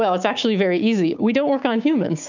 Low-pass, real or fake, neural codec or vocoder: 7.2 kHz; fake; codec, 16 kHz, 6 kbps, DAC